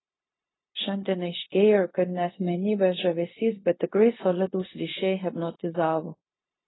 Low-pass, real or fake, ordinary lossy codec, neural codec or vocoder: 7.2 kHz; fake; AAC, 16 kbps; codec, 16 kHz, 0.4 kbps, LongCat-Audio-Codec